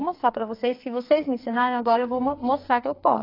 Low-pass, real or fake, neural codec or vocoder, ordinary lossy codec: 5.4 kHz; fake; codec, 44.1 kHz, 2.6 kbps, SNAC; AAC, 32 kbps